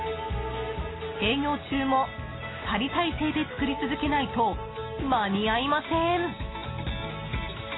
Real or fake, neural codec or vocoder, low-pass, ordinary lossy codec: real; none; 7.2 kHz; AAC, 16 kbps